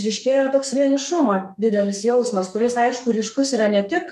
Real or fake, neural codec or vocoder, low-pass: fake; codec, 32 kHz, 1.9 kbps, SNAC; 14.4 kHz